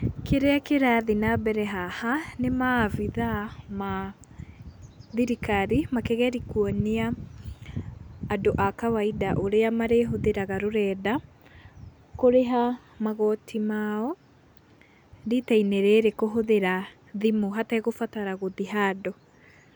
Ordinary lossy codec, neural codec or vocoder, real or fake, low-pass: none; none; real; none